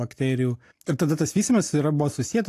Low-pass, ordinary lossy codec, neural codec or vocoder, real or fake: 14.4 kHz; AAC, 64 kbps; none; real